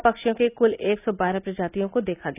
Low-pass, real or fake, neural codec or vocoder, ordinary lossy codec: 3.6 kHz; real; none; none